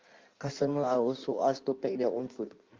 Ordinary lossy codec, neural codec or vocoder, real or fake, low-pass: Opus, 32 kbps; codec, 16 kHz in and 24 kHz out, 1.1 kbps, FireRedTTS-2 codec; fake; 7.2 kHz